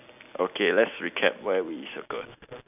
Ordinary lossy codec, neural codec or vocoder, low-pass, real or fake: none; none; 3.6 kHz; real